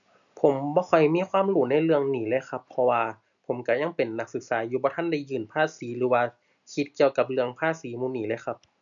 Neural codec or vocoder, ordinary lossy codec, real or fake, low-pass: none; none; real; 7.2 kHz